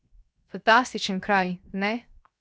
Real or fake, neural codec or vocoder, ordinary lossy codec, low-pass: fake; codec, 16 kHz, 0.7 kbps, FocalCodec; none; none